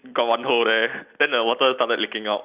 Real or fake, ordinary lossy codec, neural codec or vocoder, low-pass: real; Opus, 64 kbps; none; 3.6 kHz